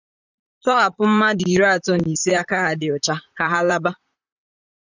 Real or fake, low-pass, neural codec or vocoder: fake; 7.2 kHz; vocoder, 44.1 kHz, 128 mel bands, Pupu-Vocoder